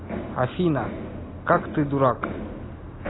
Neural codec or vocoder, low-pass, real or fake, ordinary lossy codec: none; 7.2 kHz; real; AAC, 16 kbps